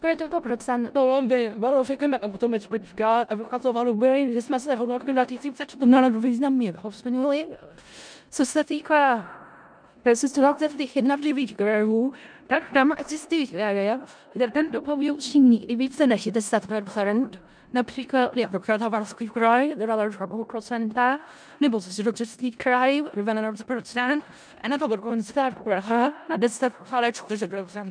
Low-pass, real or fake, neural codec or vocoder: 9.9 kHz; fake; codec, 16 kHz in and 24 kHz out, 0.4 kbps, LongCat-Audio-Codec, four codebook decoder